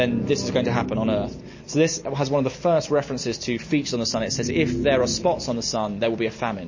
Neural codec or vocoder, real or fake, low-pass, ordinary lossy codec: none; real; 7.2 kHz; MP3, 32 kbps